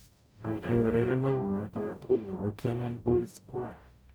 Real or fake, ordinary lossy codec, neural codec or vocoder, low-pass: fake; none; codec, 44.1 kHz, 0.9 kbps, DAC; none